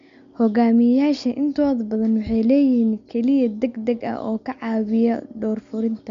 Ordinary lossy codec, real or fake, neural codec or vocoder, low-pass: MP3, 64 kbps; real; none; 7.2 kHz